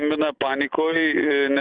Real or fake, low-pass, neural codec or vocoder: real; 9.9 kHz; none